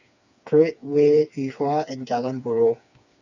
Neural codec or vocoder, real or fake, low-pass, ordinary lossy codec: codec, 16 kHz, 4 kbps, FreqCodec, smaller model; fake; 7.2 kHz; none